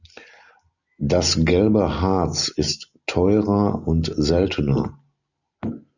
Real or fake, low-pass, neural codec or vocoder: real; 7.2 kHz; none